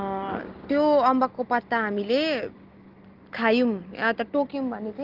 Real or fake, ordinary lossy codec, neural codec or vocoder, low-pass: real; Opus, 16 kbps; none; 5.4 kHz